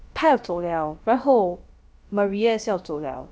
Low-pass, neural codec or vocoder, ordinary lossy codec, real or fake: none; codec, 16 kHz, about 1 kbps, DyCAST, with the encoder's durations; none; fake